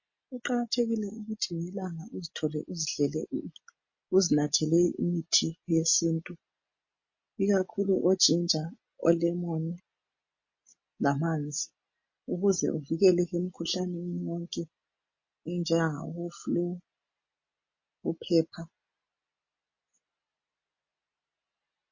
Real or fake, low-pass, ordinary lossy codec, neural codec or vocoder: real; 7.2 kHz; MP3, 32 kbps; none